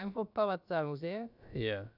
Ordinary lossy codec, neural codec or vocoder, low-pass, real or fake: none; codec, 16 kHz, about 1 kbps, DyCAST, with the encoder's durations; 5.4 kHz; fake